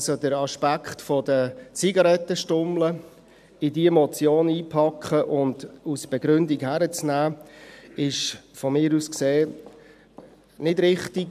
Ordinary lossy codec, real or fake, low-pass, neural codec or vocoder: AAC, 96 kbps; real; 14.4 kHz; none